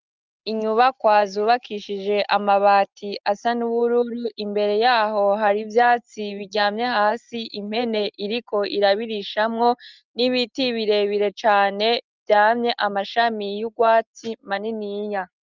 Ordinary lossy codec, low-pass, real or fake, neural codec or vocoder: Opus, 24 kbps; 7.2 kHz; real; none